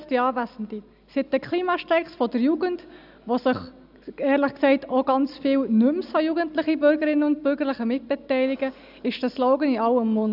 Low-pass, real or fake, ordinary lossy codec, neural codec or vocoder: 5.4 kHz; real; none; none